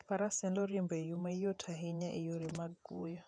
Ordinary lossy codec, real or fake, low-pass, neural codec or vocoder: none; fake; 9.9 kHz; vocoder, 48 kHz, 128 mel bands, Vocos